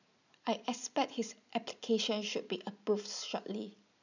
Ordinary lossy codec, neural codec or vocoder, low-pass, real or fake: none; none; 7.2 kHz; real